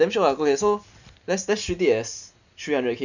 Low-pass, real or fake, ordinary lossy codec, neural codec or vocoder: 7.2 kHz; real; none; none